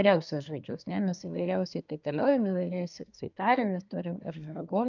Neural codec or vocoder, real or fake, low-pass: codec, 24 kHz, 1 kbps, SNAC; fake; 7.2 kHz